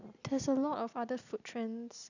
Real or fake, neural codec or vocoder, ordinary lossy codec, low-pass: real; none; none; 7.2 kHz